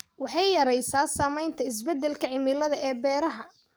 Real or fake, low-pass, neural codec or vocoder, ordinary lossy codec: fake; none; codec, 44.1 kHz, 7.8 kbps, DAC; none